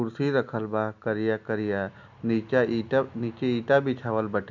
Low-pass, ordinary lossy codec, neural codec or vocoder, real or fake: 7.2 kHz; none; none; real